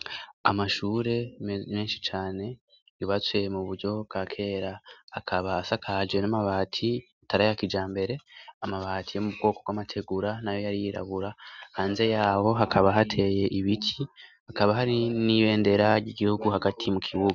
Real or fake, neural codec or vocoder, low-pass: real; none; 7.2 kHz